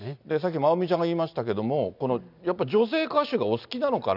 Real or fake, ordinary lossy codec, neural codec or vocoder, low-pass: real; none; none; 5.4 kHz